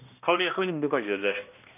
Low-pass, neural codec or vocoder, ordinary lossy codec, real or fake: 3.6 kHz; codec, 16 kHz, 1 kbps, X-Codec, HuBERT features, trained on balanced general audio; none; fake